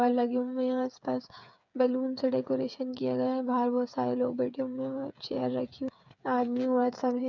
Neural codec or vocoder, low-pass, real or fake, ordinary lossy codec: codec, 16 kHz, 8 kbps, FreqCodec, smaller model; 7.2 kHz; fake; none